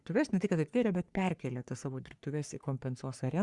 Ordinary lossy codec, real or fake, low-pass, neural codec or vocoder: Opus, 64 kbps; fake; 10.8 kHz; codec, 44.1 kHz, 3.4 kbps, Pupu-Codec